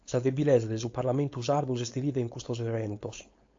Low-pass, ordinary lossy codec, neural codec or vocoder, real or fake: 7.2 kHz; AAC, 48 kbps; codec, 16 kHz, 4.8 kbps, FACodec; fake